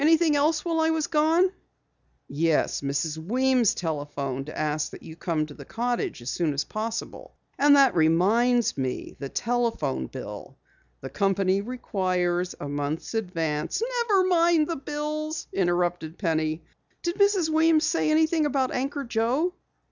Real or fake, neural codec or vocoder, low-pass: real; none; 7.2 kHz